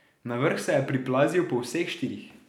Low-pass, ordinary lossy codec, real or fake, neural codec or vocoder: 19.8 kHz; none; real; none